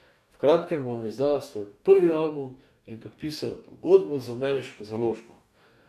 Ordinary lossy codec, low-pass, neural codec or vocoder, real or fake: none; 14.4 kHz; codec, 44.1 kHz, 2.6 kbps, DAC; fake